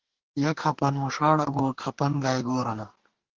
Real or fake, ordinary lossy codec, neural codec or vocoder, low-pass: fake; Opus, 16 kbps; codec, 44.1 kHz, 2.6 kbps, DAC; 7.2 kHz